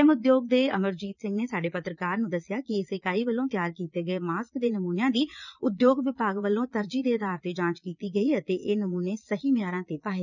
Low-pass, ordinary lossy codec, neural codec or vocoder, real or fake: 7.2 kHz; none; vocoder, 22.05 kHz, 80 mel bands, Vocos; fake